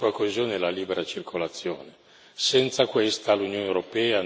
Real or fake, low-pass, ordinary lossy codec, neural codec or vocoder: real; none; none; none